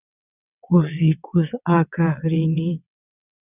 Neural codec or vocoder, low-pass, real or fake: vocoder, 22.05 kHz, 80 mel bands, WaveNeXt; 3.6 kHz; fake